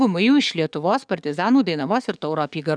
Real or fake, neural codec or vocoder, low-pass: fake; codec, 44.1 kHz, 7.8 kbps, DAC; 9.9 kHz